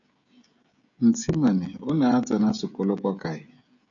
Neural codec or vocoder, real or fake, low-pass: codec, 16 kHz, 16 kbps, FreqCodec, smaller model; fake; 7.2 kHz